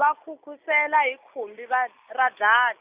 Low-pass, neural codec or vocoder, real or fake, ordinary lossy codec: 3.6 kHz; none; real; Opus, 64 kbps